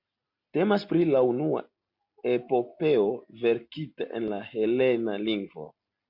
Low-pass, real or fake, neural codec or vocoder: 5.4 kHz; real; none